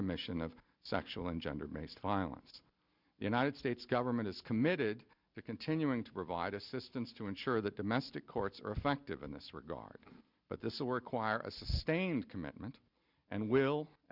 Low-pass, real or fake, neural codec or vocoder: 5.4 kHz; real; none